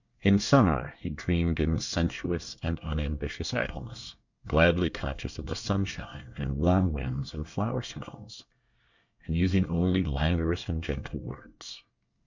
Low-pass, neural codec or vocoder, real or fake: 7.2 kHz; codec, 24 kHz, 1 kbps, SNAC; fake